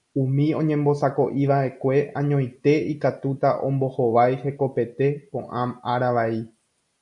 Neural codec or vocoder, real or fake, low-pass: none; real; 10.8 kHz